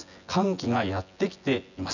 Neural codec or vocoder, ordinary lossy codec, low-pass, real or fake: vocoder, 24 kHz, 100 mel bands, Vocos; none; 7.2 kHz; fake